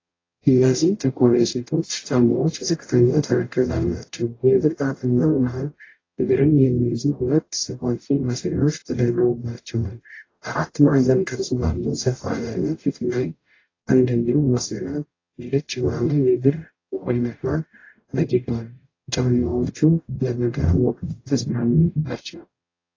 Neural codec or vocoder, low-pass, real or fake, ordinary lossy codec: codec, 44.1 kHz, 0.9 kbps, DAC; 7.2 kHz; fake; AAC, 32 kbps